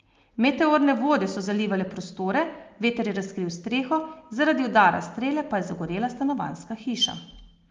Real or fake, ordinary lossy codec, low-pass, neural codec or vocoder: real; Opus, 32 kbps; 7.2 kHz; none